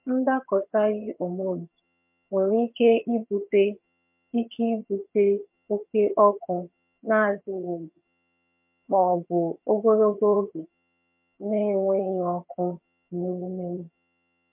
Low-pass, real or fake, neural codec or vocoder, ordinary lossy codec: 3.6 kHz; fake; vocoder, 22.05 kHz, 80 mel bands, HiFi-GAN; MP3, 32 kbps